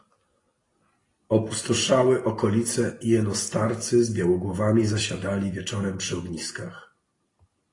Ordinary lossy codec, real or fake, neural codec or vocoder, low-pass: AAC, 32 kbps; real; none; 10.8 kHz